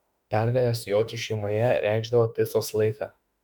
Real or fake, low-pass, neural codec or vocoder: fake; 19.8 kHz; autoencoder, 48 kHz, 32 numbers a frame, DAC-VAE, trained on Japanese speech